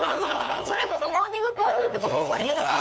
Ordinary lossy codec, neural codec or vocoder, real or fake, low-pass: none; codec, 16 kHz, 2 kbps, FunCodec, trained on LibriTTS, 25 frames a second; fake; none